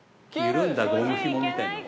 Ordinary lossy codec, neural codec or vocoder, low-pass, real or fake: none; none; none; real